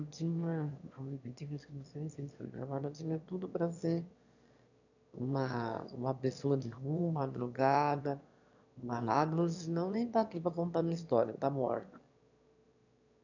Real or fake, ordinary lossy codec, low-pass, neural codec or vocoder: fake; none; 7.2 kHz; autoencoder, 22.05 kHz, a latent of 192 numbers a frame, VITS, trained on one speaker